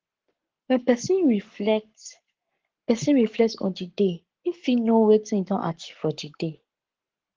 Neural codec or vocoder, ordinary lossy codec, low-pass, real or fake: codec, 44.1 kHz, 7.8 kbps, Pupu-Codec; Opus, 16 kbps; 7.2 kHz; fake